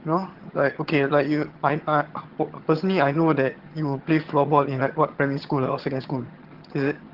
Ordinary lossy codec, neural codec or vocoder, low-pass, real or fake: Opus, 16 kbps; vocoder, 22.05 kHz, 80 mel bands, HiFi-GAN; 5.4 kHz; fake